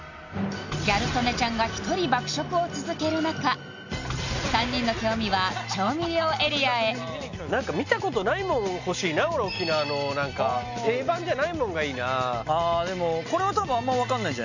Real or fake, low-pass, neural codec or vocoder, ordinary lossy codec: real; 7.2 kHz; none; none